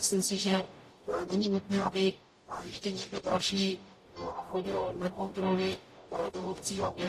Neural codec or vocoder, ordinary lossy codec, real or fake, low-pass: codec, 44.1 kHz, 0.9 kbps, DAC; AAC, 48 kbps; fake; 14.4 kHz